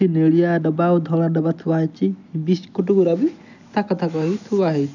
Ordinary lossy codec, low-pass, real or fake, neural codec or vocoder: none; 7.2 kHz; real; none